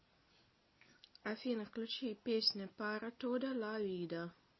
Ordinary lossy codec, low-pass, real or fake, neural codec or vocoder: MP3, 24 kbps; 7.2 kHz; real; none